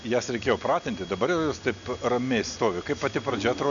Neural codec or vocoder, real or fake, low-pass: none; real; 7.2 kHz